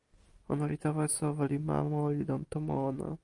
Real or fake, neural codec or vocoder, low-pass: real; none; 10.8 kHz